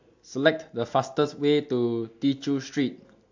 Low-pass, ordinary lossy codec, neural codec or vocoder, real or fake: 7.2 kHz; AAC, 48 kbps; none; real